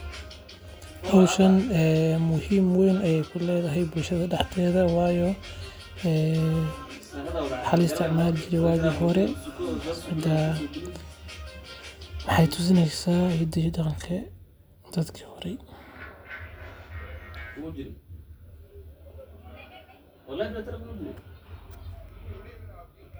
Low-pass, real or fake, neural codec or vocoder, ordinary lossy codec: none; real; none; none